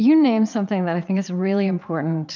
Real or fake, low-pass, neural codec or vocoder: fake; 7.2 kHz; vocoder, 22.05 kHz, 80 mel bands, Vocos